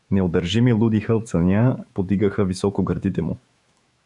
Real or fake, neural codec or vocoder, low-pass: fake; autoencoder, 48 kHz, 128 numbers a frame, DAC-VAE, trained on Japanese speech; 10.8 kHz